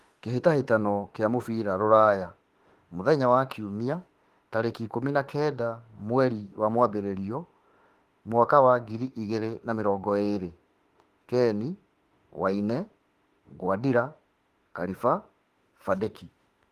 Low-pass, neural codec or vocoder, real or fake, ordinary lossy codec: 14.4 kHz; autoencoder, 48 kHz, 32 numbers a frame, DAC-VAE, trained on Japanese speech; fake; Opus, 24 kbps